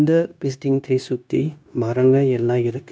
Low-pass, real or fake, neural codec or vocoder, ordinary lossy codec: none; fake; codec, 16 kHz, 0.9 kbps, LongCat-Audio-Codec; none